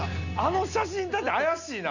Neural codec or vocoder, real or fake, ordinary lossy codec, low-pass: none; real; none; 7.2 kHz